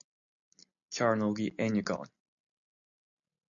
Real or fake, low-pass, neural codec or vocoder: real; 7.2 kHz; none